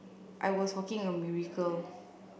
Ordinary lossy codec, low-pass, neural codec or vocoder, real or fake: none; none; none; real